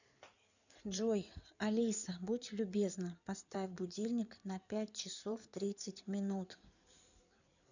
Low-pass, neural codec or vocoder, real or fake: 7.2 kHz; codec, 16 kHz in and 24 kHz out, 2.2 kbps, FireRedTTS-2 codec; fake